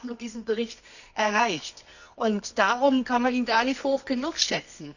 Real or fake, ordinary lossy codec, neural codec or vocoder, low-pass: fake; none; codec, 24 kHz, 0.9 kbps, WavTokenizer, medium music audio release; 7.2 kHz